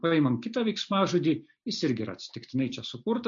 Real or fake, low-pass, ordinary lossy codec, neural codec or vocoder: real; 7.2 kHz; MP3, 48 kbps; none